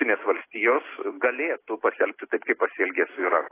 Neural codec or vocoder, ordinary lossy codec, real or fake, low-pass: none; AAC, 16 kbps; real; 3.6 kHz